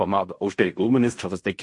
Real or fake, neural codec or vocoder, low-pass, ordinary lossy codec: fake; codec, 16 kHz in and 24 kHz out, 0.4 kbps, LongCat-Audio-Codec, fine tuned four codebook decoder; 10.8 kHz; MP3, 48 kbps